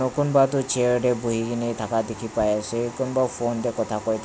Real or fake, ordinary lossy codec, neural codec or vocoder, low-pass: real; none; none; none